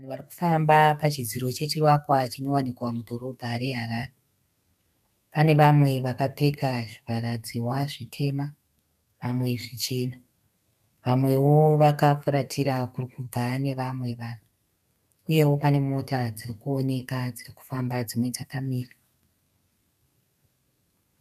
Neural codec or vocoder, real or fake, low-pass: codec, 32 kHz, 1.9 kbps, SNAC; fake; 14.4 kHz